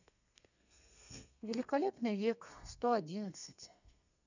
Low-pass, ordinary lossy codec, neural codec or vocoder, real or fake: 7.2 kHz; none; codec, 32 kHz, 1.9 kbps, SNAC; fake